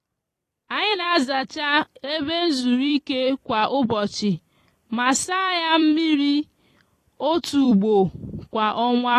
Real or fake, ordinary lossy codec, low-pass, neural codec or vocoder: fake; AAC, 48 kbps; 14.4 kHz; vocoder, 44.1 kHz, 128 mel bands every 256 samples, BigVGAN v2